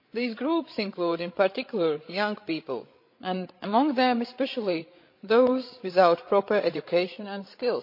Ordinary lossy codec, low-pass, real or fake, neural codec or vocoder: MP3, 32 kbps; 5.4 kHz; fake; codec, 16 kHz, 16 kbps, FreqCodec, larger model